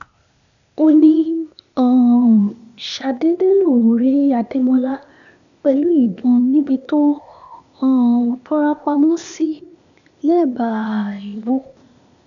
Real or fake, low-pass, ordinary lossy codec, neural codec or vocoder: fake; 7.2 kHz; none; codec, 16 kHz, 0.8 kbps, ZipCodec